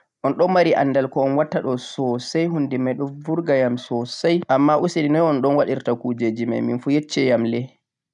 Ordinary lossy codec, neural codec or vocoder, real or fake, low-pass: none; none; real; 10.8 kHz